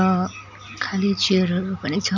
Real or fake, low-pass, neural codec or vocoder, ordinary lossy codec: real; 7.2 kHz; none; none